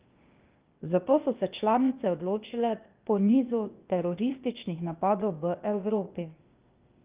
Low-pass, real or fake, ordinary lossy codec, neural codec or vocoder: 3.6 kHz; fake; Opus, 24 kbps; codec, 16 kHz, 0.8 kbps, ZipCodec